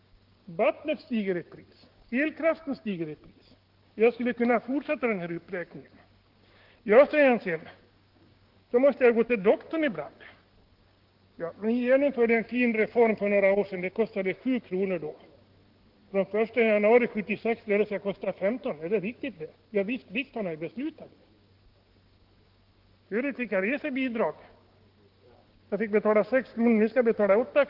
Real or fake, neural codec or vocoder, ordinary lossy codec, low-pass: fake; codec, 16 kHz, 6 kbps, DAC; Opus, 16 kbps; 5.4 kHz